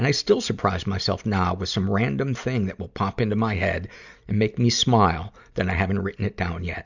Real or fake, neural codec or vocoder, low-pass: real; none; 7.2 kHz